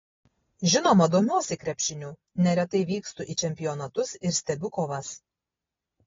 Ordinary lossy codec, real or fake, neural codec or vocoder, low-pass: AAC, 24 kbps; real; none; 7.2 kHz